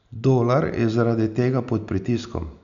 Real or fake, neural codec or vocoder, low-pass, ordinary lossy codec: real; none; 7.2 kHz; none